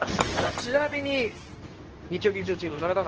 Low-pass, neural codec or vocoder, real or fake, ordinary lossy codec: 7.2 kHz; codec, 24 kHz, 0.9 kbps, WavTokenizer, medium speech release version 2; fake; Opus, 16 kbps